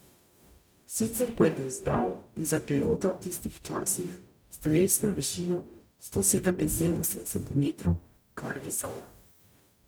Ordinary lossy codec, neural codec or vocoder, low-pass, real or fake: none; codec, 44.1 kHz, 0.9 kbps, DAC; none; fake